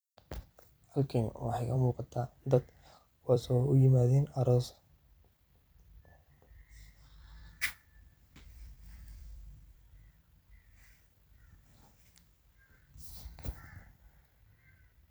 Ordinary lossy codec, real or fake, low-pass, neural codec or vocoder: none; fake; none; vocoder, 44.1 kHz, 128 mel bands every 256 samples, BigVGAN v2